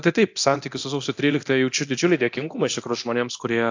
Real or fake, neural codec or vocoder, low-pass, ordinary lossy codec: fake; codec, 24 kHz, 0.9 kbps, DualCodec; 7.2 kHz; AAC, 48 kbps